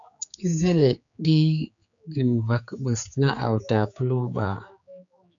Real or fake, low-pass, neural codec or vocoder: fake; 7.2 kHz; codec, 16 kHz, 4 kbps, X-Codec, HuBERT features, trained on general audio